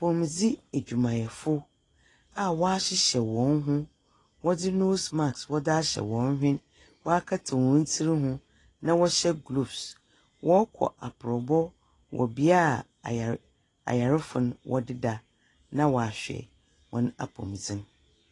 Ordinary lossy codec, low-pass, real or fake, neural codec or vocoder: AAC, 32 kbps; 10.8 kHz; real; none